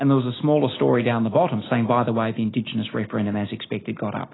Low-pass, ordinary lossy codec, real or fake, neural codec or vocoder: 7.2 kHz; AAC, 16 kbps; real; none